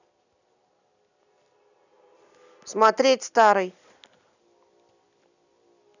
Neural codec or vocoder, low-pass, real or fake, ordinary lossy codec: none; 7.2 kHz; real; none